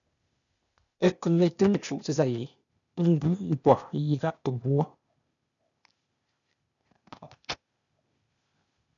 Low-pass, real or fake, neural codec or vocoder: 7.2 kHz; fake; codec, 16 kHz, 0.8 kbps, ZipCodec